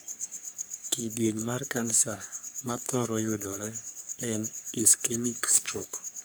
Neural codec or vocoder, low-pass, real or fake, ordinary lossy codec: codec, 44.1 kHz, 3.4 kbps, Pupu-Codec; none; fake; none